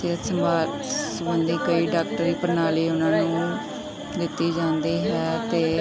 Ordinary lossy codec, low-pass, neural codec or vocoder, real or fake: none; none; none; real